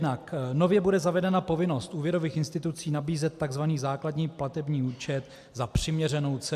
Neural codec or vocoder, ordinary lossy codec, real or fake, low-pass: none; AAC, 96 kbps; real; 14.4 kHz